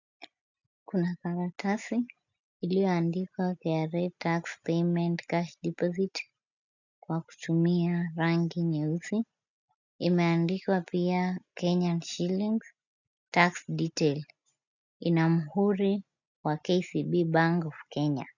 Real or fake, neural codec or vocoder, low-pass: real; none; 7.2 kHz